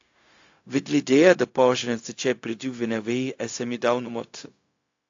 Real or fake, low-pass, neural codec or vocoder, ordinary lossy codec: fake; 7.2 kHz; codec, 16 kHz, 0.4 kbps, LongCat-Audio-Codec; AAC, 48 kbps